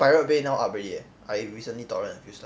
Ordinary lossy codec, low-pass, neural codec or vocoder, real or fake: none; none; none; real